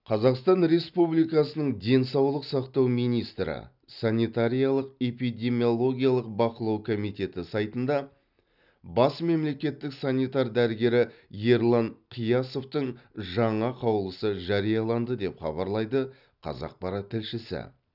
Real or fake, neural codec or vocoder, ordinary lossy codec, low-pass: real; none; none; 5.4 kHz